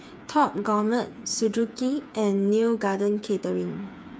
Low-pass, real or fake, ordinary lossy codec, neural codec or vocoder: none; fake; none; codec, 16 kHz, 8 kbps, FreqCodec, smaller model